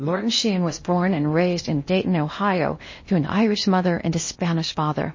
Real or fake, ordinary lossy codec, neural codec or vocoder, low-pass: fake; MP3, 32 kbps; codec, 16 kHz in and 24 kHz out, 0.8 kbps, FocalCodec, streaming, 65536 codes; 7.2 kHz